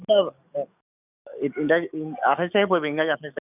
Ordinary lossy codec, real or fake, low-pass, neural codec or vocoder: none; fake; 3.6 kHz; codec, 44.1 kHz, 7.8 kbps, DAC